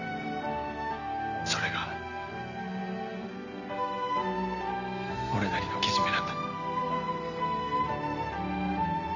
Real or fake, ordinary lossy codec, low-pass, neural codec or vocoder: real; none; 7.2 kHz; none